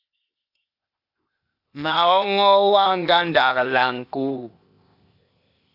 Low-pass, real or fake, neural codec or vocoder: 5.4 kHz; fake; codec, 16 kHz, 0.8 kbps, ZipCodec